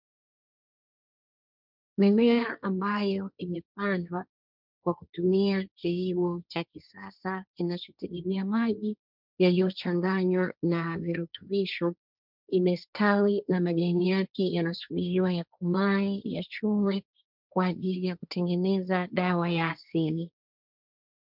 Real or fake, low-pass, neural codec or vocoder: fake; 5.4 kHz; codec, 16 kHz, 1.1 kbps, Voila-Tokenizer